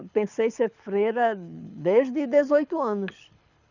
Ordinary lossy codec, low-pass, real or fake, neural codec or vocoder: none; 7.2 kHz; fake; codec, 24 kHz, 6 kbps, HILCodec